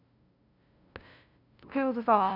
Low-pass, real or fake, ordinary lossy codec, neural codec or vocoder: 5.4 kHz; fake; none; codec, 16 kHz, 0.5 kbps, FunCodec, trained on LibriTTS, 25 frames a second